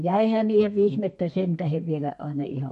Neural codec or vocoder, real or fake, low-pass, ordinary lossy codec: codec, 32 kHz, 1.9 kbps, SNAC; fake; 14.4 kHz; MP3, 48 kbps